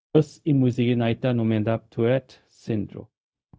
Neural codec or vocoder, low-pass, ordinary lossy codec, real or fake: codec, 16 kHz, 0.4 kbps, LongCat-Audio-Codec; none; none; fake